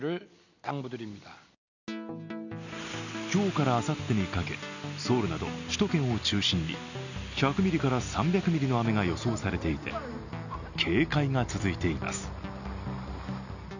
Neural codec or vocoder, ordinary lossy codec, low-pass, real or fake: none; none; 7.2 kHz; real